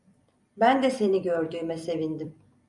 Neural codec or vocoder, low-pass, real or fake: vocoder, 44.1 kHz, 128 mel bands every 256 samples, BigVGAN v2; 10.8 kHz; fake